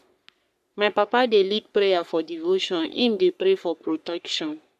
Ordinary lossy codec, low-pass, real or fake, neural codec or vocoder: none; 14.4 kHz; fake; codec, 44.1 kHz, 3.4 kbps, Pupu-Codec